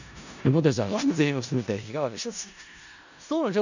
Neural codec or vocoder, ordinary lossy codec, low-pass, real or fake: codec, 16 kHz in and 24 kHz out, 0.4 kbps, LongCat-Audio-Codec, four codebook decoder; none; 7.2 kHz; fake